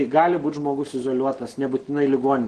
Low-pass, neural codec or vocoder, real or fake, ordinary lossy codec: 9.9 kHz; none; real; Opus, 16 kbps